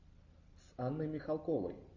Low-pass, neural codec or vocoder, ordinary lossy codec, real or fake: 7.2 kHz; none; AAC, 48 kbps; real